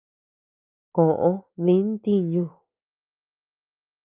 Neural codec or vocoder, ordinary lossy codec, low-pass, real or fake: none; Opus, 24 kbps; 3.6 kHz; real